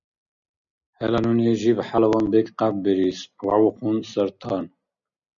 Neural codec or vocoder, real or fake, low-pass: none; real; 7.2 kHz